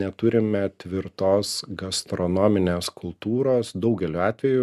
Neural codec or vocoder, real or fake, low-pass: none; real; 14.4 kHz